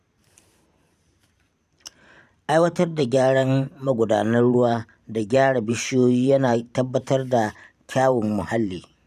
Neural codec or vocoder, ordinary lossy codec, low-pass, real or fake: vocoder, 44.1 kHz, 128 mel bands every 512 samples, BigVGAN v2; none; 14.4 kHz; fake